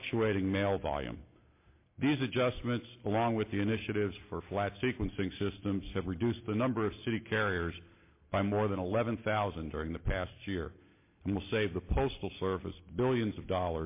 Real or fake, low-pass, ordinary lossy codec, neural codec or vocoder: real; 3.6 kHz; MP3, 24 kbps; none